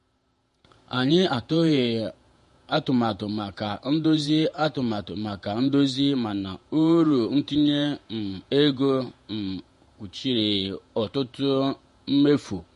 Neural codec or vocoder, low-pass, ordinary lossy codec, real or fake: vocoder, 48 kHz, 128 mel bands, Vocos; 14.4 kHz; MP3, 48 kbps; fake